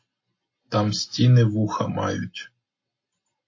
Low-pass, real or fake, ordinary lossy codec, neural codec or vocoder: 7.2 kHz; real; MP3, 32 kbps; none